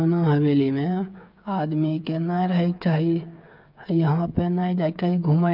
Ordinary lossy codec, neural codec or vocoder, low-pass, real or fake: none; codec, 16 kHz, 8 kbps, FreqCodec, smaller model; 5.4 kHz; fake